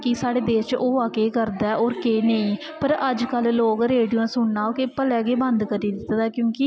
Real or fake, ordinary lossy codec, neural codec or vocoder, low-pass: real; none; none; none